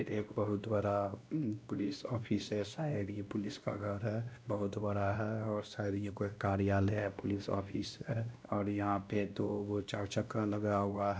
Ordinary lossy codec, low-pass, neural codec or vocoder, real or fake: none; none; codec, 16 kHz, 1 kbps, X-Codec, WavLM features, trained on Multilingual LibriSpeech; fake